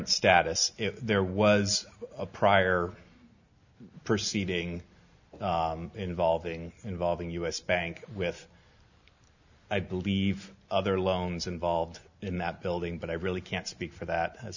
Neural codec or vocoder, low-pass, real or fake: none; 7.2 kHz; real